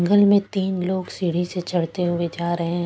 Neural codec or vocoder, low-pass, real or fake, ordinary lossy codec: none; none; real; none